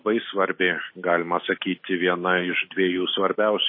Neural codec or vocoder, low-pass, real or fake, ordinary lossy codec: none; 5.4 kHz; real; MP3, 24 kbps